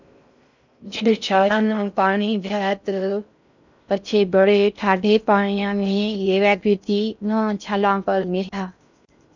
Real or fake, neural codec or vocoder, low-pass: fake; codec, 16 kHz in and 24 kHz out, 0.6 kbps, FocalCodec, streaming, 4096 codes; 7.2 kHz